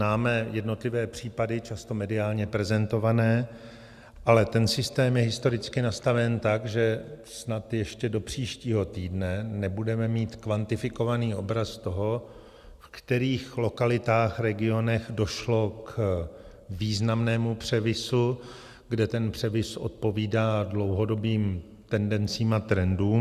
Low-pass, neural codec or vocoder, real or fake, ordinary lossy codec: 14.4 kHz; none; real; Opus, 64 kbps